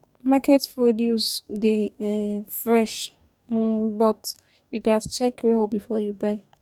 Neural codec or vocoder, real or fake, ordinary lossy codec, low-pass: codec, 44.1 kHz, 2.6 kbps, DAC; fake; none; 19.8 kHz